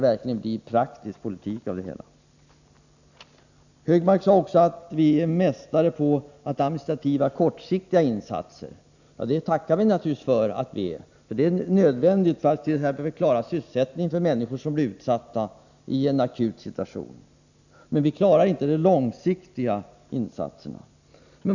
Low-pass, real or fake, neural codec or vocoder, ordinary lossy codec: 7.2 kHz; real; none; none